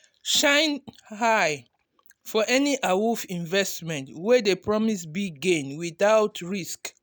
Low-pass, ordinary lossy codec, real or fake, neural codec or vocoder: none; none; real; none